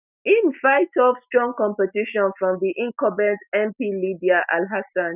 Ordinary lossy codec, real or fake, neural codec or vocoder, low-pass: none; real; none; 3.6 kHz